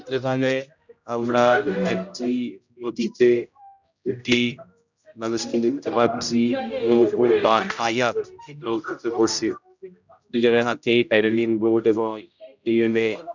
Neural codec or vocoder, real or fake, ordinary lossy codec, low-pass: codec, 16 kHz, 0.5 kbps, X-Codec, HuBERT features, trained on general audio; fake; none; 7.2 kHz